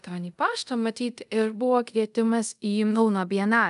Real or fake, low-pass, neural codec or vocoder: fake; 10.8 kHz; codec, 24 kHz, 0.5 kbps, DualCodec